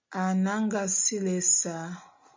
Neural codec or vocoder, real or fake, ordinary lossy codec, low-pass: none; real; MP3, 64 kbps; 7.2 kHz